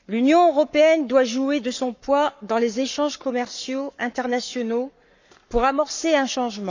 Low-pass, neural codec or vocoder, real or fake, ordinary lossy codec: 7.2 kHz; codec, 44.1 kHz, 7.8 kbps, Pupu-Codec; fake; none